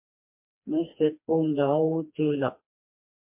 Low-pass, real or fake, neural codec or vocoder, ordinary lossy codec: 3.6 kHz; fake; codec, 16 kHz, 2 kbps, FreqCodec, smaller model; MP3, 32 kbps